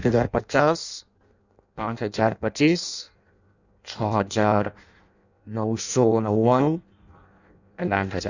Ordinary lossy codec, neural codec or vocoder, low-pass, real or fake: none; codec, 16 kHz in and 24 kHz out, 0.6 kbps, FireRedTTS-2 codec; 7.2 kHz; fake